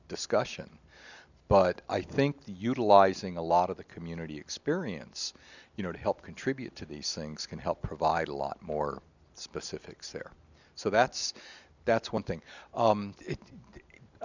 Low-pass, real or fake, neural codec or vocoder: 7.2 kHz; real; none